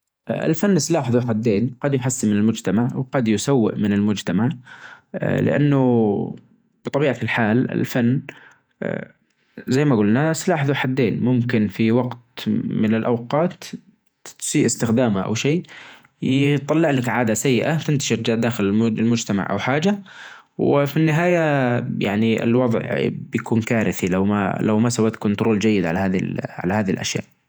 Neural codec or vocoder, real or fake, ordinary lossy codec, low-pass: vocoder, 48 kHz, 128 mel bands, Vocos; fake; none; none